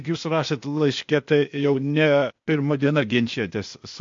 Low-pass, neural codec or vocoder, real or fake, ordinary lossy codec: 7.2 kHz; codec, 16 kHz, 0.8 kbps, ZipCodec; fake; MP3, 48 kbps